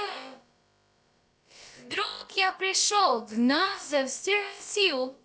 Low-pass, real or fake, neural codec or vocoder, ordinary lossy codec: none; fake; codec, 16 kHz, about 1 kbps, DyCAST, with the encoder's durations; none